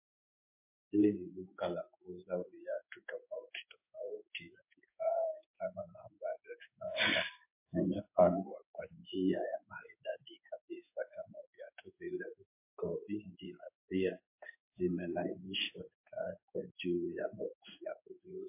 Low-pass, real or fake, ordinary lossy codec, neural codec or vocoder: 3.6 kHz; fake; MP3, 24 kbps; codec, 16 kHz in and 24 kHz out, 1 kbps, XY-Tokenizer